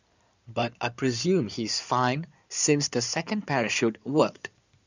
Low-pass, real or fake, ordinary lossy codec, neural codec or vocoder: 7.2 kHz; fake; none; codec, 16 kHz in and 24 kHz out, 2.2 kbps, FireRedTTS-2 codec